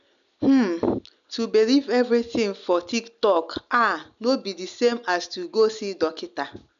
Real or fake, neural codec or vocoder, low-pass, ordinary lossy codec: real; none; 7.2 kHz; MP3, 96 kbps